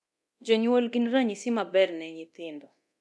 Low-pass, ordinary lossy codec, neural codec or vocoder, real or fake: none; none; codec, 24 kHz, 0.9 kbps, DualCodec; fake